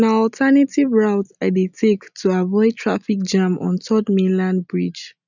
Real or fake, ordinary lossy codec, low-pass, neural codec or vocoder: real; none; 7.2 kHz; none